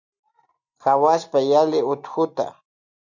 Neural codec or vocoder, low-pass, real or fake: none; 7.2 kHz; real